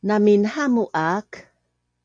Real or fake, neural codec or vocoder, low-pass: real; none; 9.9 kHz